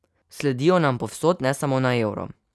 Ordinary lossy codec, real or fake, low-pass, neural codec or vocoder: none; real; none; none